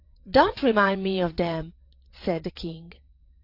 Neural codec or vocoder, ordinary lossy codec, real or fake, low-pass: vocoder, 22.05 kHz, 80 mel bands, WaveNeXt; AAC, 32 kbps; fake; 5.4 kHz